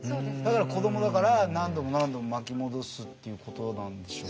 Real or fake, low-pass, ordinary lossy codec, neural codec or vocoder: real; none; none; none